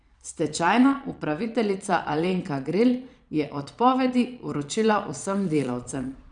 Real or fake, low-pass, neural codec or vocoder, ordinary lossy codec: fake; 9.9 kHz; vocoder, 22.05 kHz, 80 mel bands, Vocos; none